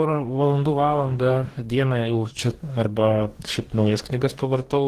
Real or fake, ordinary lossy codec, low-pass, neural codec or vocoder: fake; Opus, 16 kbps; 14.4 kHz; codec, 44.1 kHz, 2.6 kbps, DAC